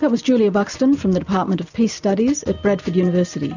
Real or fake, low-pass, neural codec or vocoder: real; 7.2 kHz; none